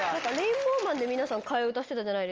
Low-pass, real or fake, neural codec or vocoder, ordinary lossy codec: 7.2 kHz; real; none; Opus, 24 kbps